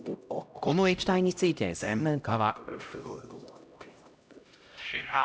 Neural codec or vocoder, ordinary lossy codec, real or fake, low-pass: codec, 16 kHz, 0.5 kbps, X-Codec, HuBERT features, trained on LibriSpeech; none; fake; none